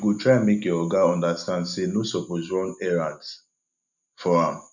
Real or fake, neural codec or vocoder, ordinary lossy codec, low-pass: real; none; none; 7.2 kHz